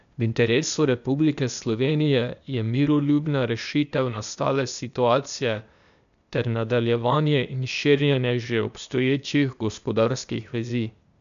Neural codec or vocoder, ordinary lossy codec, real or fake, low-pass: codec, 16 kHz, 0.8 kbps, ZipCodec; none; fake; 7.2 kHz